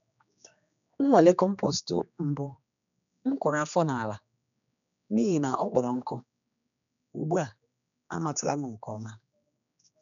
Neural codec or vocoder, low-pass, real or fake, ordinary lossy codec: codec, 16 kHz, 2 kbps, X-Codec, HuBERT features, trained on general audio; 7.2 kHz; fake; none